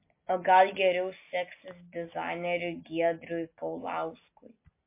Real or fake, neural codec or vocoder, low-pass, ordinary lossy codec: real; none; 3.6 kHz; MP3, 24 kbps